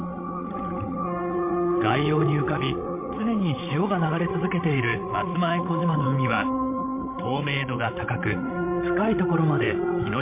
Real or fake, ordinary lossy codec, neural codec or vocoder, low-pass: fake; MP3, 24 kbps; codec, 16 kHz, 16 kbps, FreqCodec, larger model; 3.6 kHz